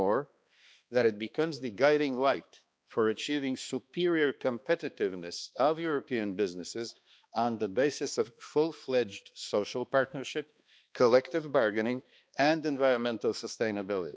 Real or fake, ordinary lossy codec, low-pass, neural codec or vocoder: fake; none; none; codec, 16 kHz, 2 kbps, X-Codec, HuBERT features, trained on balanced general audio